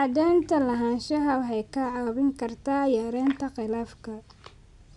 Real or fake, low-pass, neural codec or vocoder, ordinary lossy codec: real; 10.8 kHz; none; none